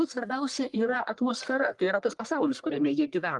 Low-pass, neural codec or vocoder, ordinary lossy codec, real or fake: 10.8 kHz; codec, 44.1 kHz, 1.7 kbps, Pupu-Codec; Opus, 32 kbps; fake